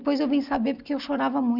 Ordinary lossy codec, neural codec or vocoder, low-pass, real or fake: none; none; 5.4 kHz; real